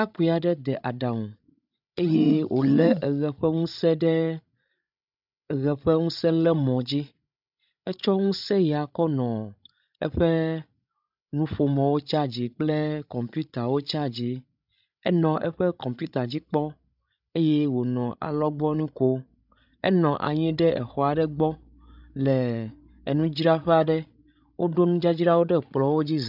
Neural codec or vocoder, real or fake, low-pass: codec, 16 kHz, 16 kbps, FreqCodec, larger model; fake; 5.4 kHz